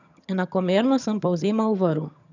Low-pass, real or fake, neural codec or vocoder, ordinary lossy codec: 7.2 kHz; fake; vocoder, 22.05 kHz, 80 mel bands, HiFi-GAN; none